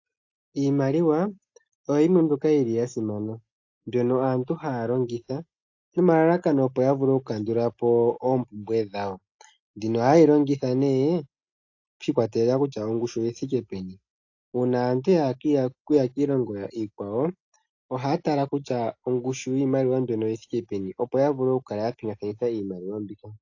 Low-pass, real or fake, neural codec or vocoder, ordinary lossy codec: 7.2 kHz; real; none; AAC, 48 kbps